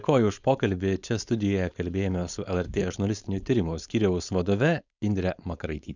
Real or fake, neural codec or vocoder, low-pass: fake; codec, 16 kHz, 4.8 kbps, FACodec; 7.2 kHz